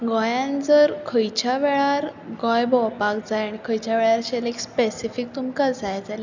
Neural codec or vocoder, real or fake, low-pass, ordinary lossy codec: none; real; 7.2 kHz; none